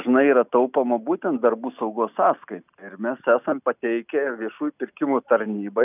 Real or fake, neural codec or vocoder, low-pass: real; none; 3.6 kHz